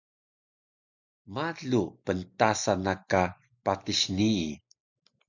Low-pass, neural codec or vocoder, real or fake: 7.2 kHz; none; real